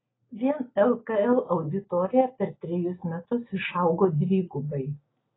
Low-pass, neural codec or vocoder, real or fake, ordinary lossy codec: 7.2 kHz; none; real; AAC, 16 kbps